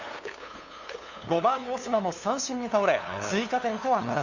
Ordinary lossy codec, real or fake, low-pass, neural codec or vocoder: Opus, 64 kbps; fake; 7.2 kHz; codec, 16 kHz, 2 kbps, FunCodec, trained on LibriTTS, 25 frames a second